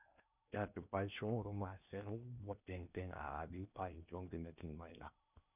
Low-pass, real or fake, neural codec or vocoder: 3.6 kHz; fake; codec, 16 kHz in and 24 kHz out, 0.8 kbps, FocalCodec, streaming, 65536 codes